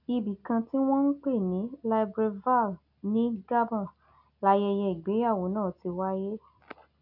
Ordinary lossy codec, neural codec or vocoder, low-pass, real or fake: none; none; 5.4 kHz; real